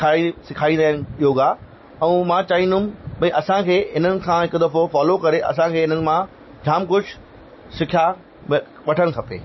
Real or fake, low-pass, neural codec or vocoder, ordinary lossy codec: real; 7.2 kHz; none; MP3, 24 kbps